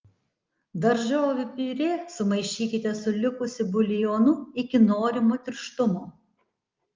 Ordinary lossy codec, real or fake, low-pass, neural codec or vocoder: Opus, 24 kbps; real; 7.2 kHz; none